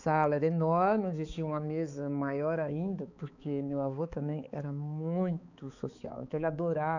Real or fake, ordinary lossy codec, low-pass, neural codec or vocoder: fake; none; 7.2 kHz; codec, 16 kHz, 4 kbps, X-Codec, HuBERT features, trained on balanced general audio